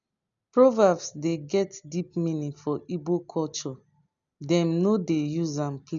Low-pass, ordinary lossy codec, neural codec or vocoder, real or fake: 7.2 kHz; none; none; real